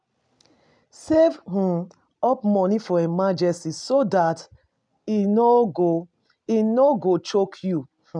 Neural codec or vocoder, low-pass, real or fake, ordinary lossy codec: none; 9.9 kHz; real; none